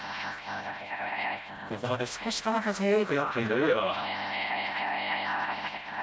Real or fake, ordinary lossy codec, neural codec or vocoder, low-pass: fake; none; codec, 16 kHz, 0.5 kbps, FreqCodec, smaller model; none